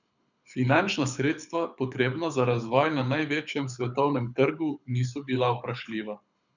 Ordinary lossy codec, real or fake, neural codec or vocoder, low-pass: none; fake; codec, 24 kHz, 6 kbps, HILCodec; 7.2 kHz